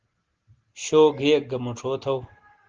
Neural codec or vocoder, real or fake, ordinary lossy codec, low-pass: none; real; Opus, 16 kbps; 7.2 kHz